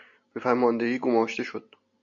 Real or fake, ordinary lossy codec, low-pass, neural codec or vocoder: real; MP3, 64 kbps; 7.2 kHz; none